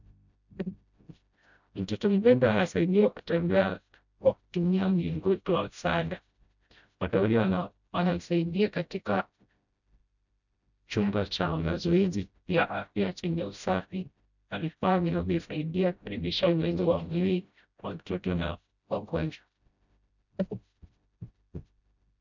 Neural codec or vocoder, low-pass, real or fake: codec, 16 kHz, 0.5 kbps, FreqCodec, smaller model; 7.2 kHz; fake